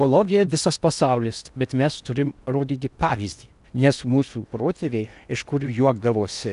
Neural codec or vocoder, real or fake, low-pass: codec, 16 kHz in and 24 kHz out, 0.8 kbps, FocalCodec, streaming, 65536 codes; fake; 10.8 kHz